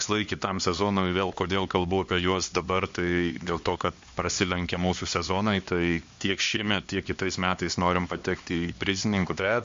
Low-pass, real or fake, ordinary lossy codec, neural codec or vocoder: 7.2 kHz; fake; MP3, 48 kbps; codec, 16 kHz, 2 kbps, X-Codec, HuBERT features, trained on LibriSpeech